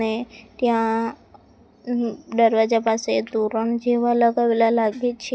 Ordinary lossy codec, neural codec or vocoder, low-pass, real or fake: none; none; none; real